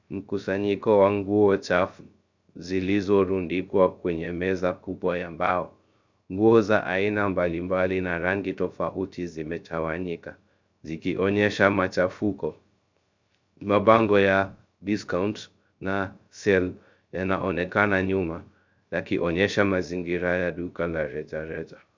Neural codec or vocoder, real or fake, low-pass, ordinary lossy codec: codec, 16 kHz, 0.3 kbps, FocalCodec; fake; 7.2 kHz; MP3, 64 kbps